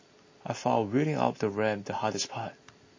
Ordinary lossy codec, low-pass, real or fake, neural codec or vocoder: MP3, 32 kbps; 7.2 kHz; real; none